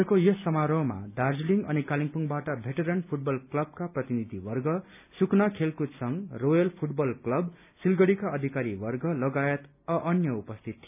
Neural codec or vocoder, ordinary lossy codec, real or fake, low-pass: none; none; real; 3.6 kHz